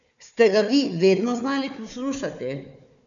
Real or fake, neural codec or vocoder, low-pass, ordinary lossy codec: fake; codec, 16 kHz, 4 kbps, FunCodec, trained on Chinese and English, 50 frames a second; 7.2 kHz; none